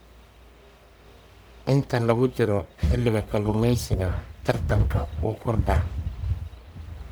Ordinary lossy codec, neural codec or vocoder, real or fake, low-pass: none; codec, 44.1 kHz, 1.7 kbps, Pupu-Codec; fake; none